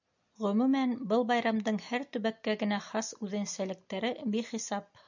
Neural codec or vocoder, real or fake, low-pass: none; real; 7.2 kHz